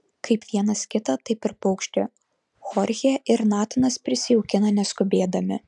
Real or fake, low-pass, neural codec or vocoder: real; 10.8 kHz; none